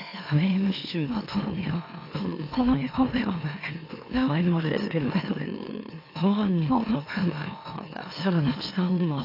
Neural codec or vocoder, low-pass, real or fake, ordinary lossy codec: autoencoder, 44.1 kHz, a latent of 192 numbers a frame, MeloTTS; 5.4 kHz; fake; AAC, 32 kbps